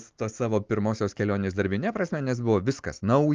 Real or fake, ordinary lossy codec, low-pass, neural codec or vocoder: real; Opus, 32 kbps; 7.2 kHz; none